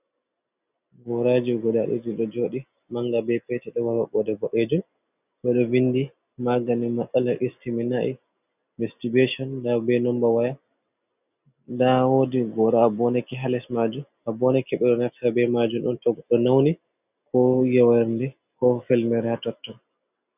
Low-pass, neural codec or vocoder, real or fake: 3.6 kHz; none; real